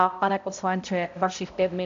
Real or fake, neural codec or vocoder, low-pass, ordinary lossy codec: fake; codec, 16 kHz, 0.5 kbps, X-Codec, HuBERT features, trained on balanced general audio; 7.2 kHz; AAC, 64 kbps